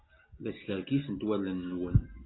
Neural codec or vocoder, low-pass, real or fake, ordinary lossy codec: none; 7.2 kHz; real; AAC, 16 kbps